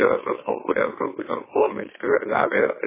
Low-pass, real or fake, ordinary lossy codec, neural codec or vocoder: 3.6 kHz; fake; MP3, 16 kbps; autoencoder, 44.1 kHz, a latent of 192 numbers a frame, MeloTTS